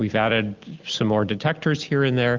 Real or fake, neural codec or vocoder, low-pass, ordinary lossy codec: real; none; 7.2 kHz; Opus, 32 kbps